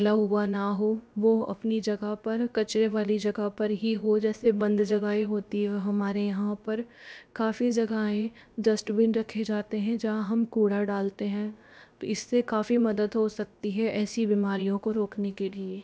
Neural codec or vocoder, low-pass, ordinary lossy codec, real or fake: codec, 16 kHz, about 1 kbps, DyCAST, with the encoder's durations; none; none; fake